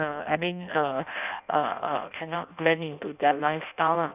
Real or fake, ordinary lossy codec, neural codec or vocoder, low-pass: fake; none; codec, 16 kHz in and 24 kHz out, 0.6 kbps, FireRedTTS-2 codec; 3.6 kHz